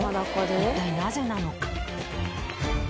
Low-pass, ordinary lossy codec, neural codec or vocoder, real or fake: none; none; none; real